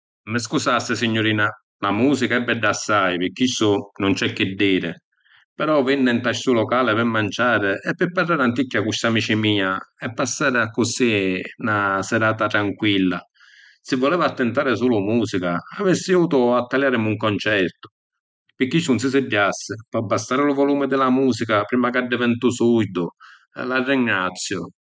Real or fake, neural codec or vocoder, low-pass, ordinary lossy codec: real; none; none; none